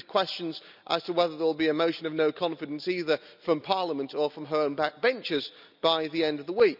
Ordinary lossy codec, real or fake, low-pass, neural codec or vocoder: none; real; 5.4 kHz; none